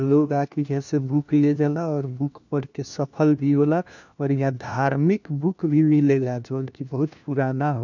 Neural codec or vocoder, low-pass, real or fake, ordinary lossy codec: codec, 16 kHz, 1 kbps, FunCodec, trained on LibriTTS, 50 frames a second; 7.2 kHz; fake; none